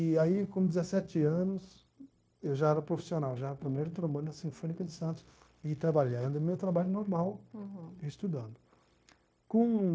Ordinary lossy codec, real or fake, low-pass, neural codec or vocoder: none; fake; none; codec, 16 kHz, 0.9 kbps, LongCat-Audio-Codec